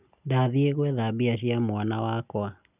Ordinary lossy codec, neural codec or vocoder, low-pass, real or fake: none; none; 3.6 kHz; real